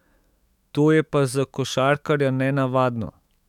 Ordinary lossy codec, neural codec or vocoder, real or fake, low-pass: none; autoencoder, 48 kHz, 128 numbers a frame, DAC-VAE, trained on Japanese speech; fake; 19.8 kHz